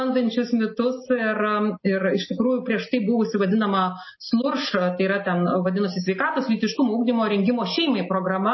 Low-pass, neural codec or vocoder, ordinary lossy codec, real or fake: 7.2 kHz; none; MP3, 24 kbps; real